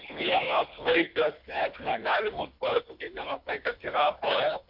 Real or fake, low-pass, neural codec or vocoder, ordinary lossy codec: fake; 5.4 kHz; codec, 24 kHz, 1.5 kbps, HILCodec; MP3, 48 kbps